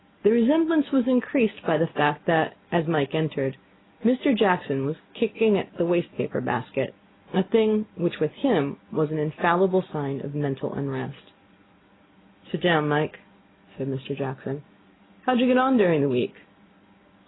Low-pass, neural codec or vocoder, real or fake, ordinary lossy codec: 7.2 kHz; none; real; AAC, 16 kbps